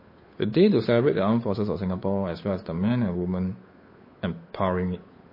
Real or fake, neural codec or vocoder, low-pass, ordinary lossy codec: fake; codec, 16 kHz, 8 kbps, FunCodec, trained on Chinese and English, 25 frames a second; 5.4 kHz; MP3, 24 kbps